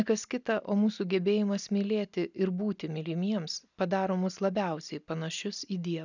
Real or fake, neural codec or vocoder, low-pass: real; none; 7.2 kHz